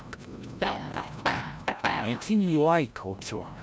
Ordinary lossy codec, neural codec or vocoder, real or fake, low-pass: none; codec, 16 kHz, 0.5 kbps, FreqCodec, larger model; fake; none